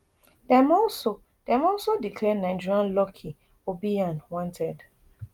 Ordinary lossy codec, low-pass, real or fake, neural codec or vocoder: Opus, 32 kbps; 19.8 kHz; real; none